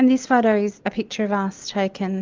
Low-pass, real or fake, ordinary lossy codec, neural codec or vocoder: 7.2 kHz; real; Opus, 32 kbps; none